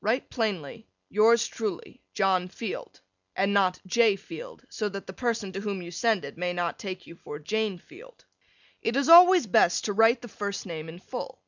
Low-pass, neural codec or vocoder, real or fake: 7.2 kHz; none; real